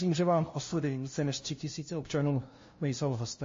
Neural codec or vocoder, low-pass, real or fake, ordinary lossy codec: codec, 16 kHz, 0.5 kbps, FunCodec, trained on LibriTTS, 25 frames a second; 7.2 kHz; fake; MP3, 32 kbps